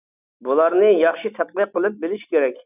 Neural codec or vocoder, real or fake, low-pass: none; real; 3.6 kHz